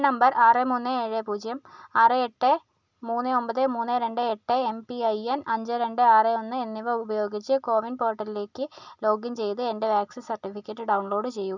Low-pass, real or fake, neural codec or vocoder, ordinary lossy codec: 7.2 kHz; real; none; none